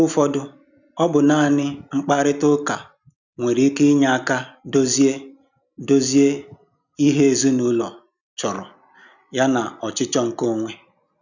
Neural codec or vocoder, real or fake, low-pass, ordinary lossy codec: none; real; 7.2 kHz; none